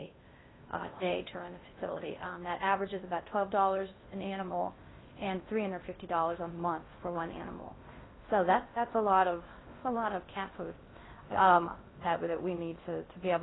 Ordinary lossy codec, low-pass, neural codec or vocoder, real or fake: AAC, 16 kbps; 7.2 kHz; codec, 16 kHz in and 24 kHz out, 0.6 kbps, FocalCodec, streaming, 2048 codes; fake